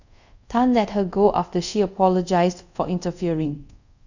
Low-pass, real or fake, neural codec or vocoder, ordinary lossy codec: 7.2 kHz; fake; codec, 24 kHz, 0.5 kbps, DualCodec; none